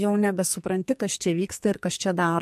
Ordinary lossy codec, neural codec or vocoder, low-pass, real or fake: MP3, 64 kbps; codec, 44.1 kHz, 2.6 kbps, SNAC; 14.4 kHz; fake